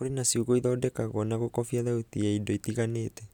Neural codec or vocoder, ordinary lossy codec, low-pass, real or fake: none; none; 19.8 kHz; real